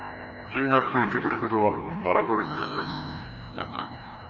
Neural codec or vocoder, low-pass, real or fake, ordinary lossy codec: codec, 16 kHz, 1 kbps, FreqCodec, larger model; 7.2 kHz; fake; none